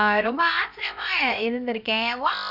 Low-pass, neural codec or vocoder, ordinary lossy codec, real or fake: 5.4 kHz; codec, 16 kHz, 0.7 kbps, FocalCodec; none; fake